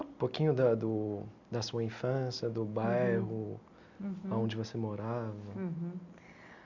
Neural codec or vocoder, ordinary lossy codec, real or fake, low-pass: none; none; real; 7.2 kHz